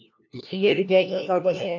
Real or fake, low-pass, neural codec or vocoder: fake; 7.2 kHz; codec, 16 kHz, 1 kbps, FunCodec, trained on LibriTTS, 50 frames a second